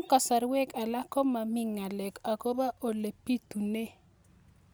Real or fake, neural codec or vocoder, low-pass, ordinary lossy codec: real; none; none; none